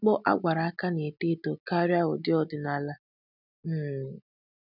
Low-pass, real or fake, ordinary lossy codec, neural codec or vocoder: 5.4 kHz; real; none; none